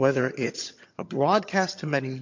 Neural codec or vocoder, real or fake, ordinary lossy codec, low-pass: vocoder, 22.05 kHz, 80 mel bands, HiFi-GAN; fake; MP3, 48 kbps; 7.2 kHz